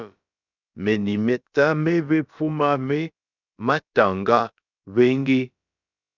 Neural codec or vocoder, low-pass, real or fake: codec, 16 kHz, about 1 kbps, DyCAST, with the encoder's durations; 7.2 kHz; fake